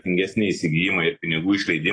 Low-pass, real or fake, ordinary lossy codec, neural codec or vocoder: 9.9 kHz; real; AAC, 32 kbps; none